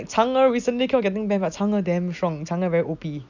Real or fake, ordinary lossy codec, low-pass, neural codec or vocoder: real; none; 7.2 kHz; none